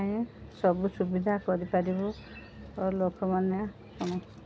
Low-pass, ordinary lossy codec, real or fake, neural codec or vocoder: none; none; real; none